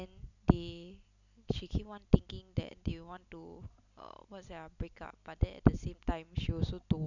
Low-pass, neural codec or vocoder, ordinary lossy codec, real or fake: 7.2 kHz; none; none; real